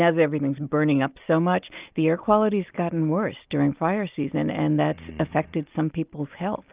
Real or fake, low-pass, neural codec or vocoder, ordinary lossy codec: real; 3.6 kHz; none; Opus, 24 kbps